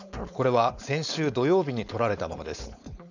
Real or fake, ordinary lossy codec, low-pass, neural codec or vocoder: fake; none; 7.2 kHz; codec, 16 kHz, 4.8 kbps, FACodec